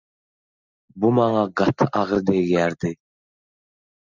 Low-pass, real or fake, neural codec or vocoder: 7.2 kHz; real; none